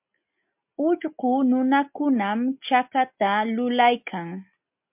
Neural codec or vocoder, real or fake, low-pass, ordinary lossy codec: none; real; 3.6 kHz; MP3, 32 kbps